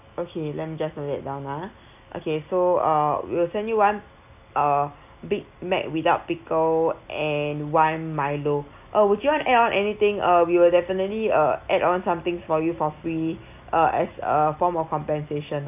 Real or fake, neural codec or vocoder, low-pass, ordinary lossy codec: real; none; 3.6 kHz; none